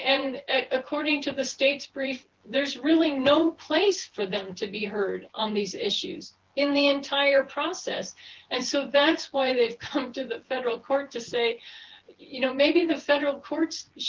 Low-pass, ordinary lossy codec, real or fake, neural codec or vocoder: 7.2 kHz; Opus, 16 kbps; fake; vocoder, 24 kHz, 100 mel bands, Vocos